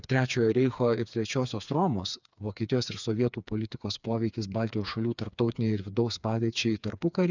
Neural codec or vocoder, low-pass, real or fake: codec, 16 kHz, 4 kbps, FreqCodec, smaller model; 7.2 kHz; fake